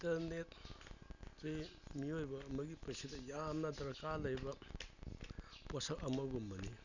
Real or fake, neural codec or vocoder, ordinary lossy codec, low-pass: real; none; none; 7.2 kHz